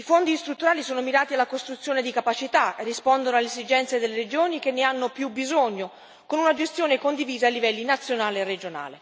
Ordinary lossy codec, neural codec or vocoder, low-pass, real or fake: none; none; none; real